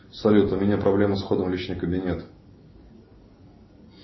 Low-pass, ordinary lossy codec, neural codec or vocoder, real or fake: 7.2 kHz; MP3, 24 kbps; none; real